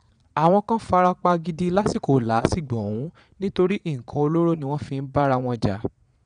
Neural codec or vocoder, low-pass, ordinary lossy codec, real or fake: vocoder, 22.05 kHz, 80 mel bands, Vocos; 9.9 kHz; none; fake